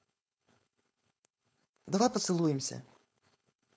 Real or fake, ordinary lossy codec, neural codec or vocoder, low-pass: fake; none; codec, 16 kHz, 4.8 kbps, FACodec; none